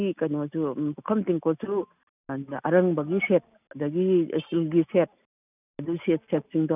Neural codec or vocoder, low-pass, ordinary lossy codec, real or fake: none; 3.6 kHz; none; real